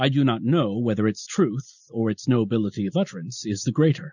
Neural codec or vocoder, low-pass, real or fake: none; 7.2 kHz; real